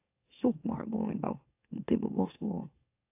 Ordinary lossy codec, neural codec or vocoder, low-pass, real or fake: AAC, 32 kbps; autoencoder, 44.1 kHz, a latent of 192 numbers a frame, MeloTTS; 3.6 kHz; fake